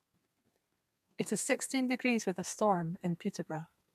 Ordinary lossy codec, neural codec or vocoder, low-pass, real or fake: MP3, 96 kbps; codec, 32 kHz, 1.9 kbps, SNAC; 14.4 kHz; fake